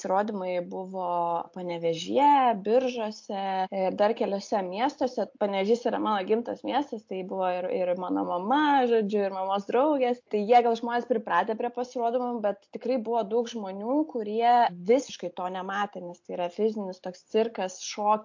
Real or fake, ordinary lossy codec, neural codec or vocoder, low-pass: real; MP3, 48 kbps; none; 7.2 kHz